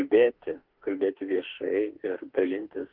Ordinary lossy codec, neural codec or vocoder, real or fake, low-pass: Opus, 16 kbps; autoencoder, 48 kHz, 32 numbers a frame, DAC-VAE, trained on Japanese speech; fake; 5.4 kHz